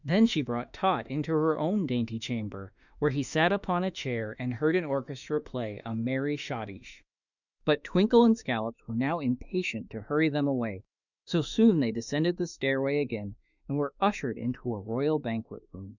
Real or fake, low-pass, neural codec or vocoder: fake; 7.2 kHz; autoencoder, 48 kHz, 32 numbers a frame, DAC-VAE, trained on Japanese speech